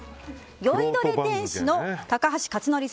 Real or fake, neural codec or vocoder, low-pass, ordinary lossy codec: real; none; none; none